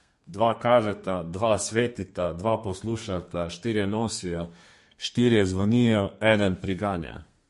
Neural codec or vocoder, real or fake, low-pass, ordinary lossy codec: codec, 32 kHz, 1.9 kbps, SNAC; fake; 14.4 kHz; MP3, 48 kbps